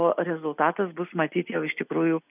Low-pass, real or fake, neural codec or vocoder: 3.6 kHz; real; none